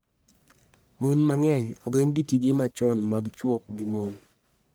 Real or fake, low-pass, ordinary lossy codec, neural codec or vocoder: fake; none; none; codec, 44.1 kHz, 1.7 kbps, Pupu-Codec